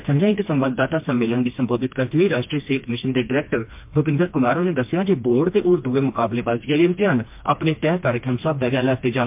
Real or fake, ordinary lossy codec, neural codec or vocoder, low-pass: fake; MP3, 32 kbps; codec, 16 kHz, 2 kbps, FreqCodec, smaller model; 3.6 kHz